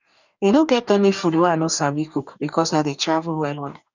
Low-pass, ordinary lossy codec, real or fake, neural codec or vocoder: 7.2 kHz; none; fake; codec, 44.1 kHz, 2.6 kbps, DAC